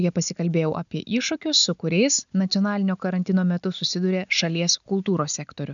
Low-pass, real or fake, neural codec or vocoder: 7.2 kHz; real; none